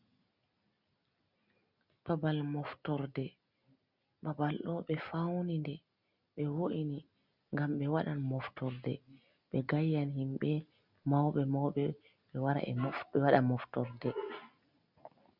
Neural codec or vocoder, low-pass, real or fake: none; 5.4 kHz; real